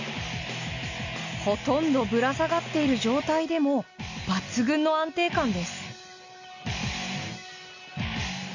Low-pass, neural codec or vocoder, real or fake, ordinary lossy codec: 7.2 kHz; none; real; none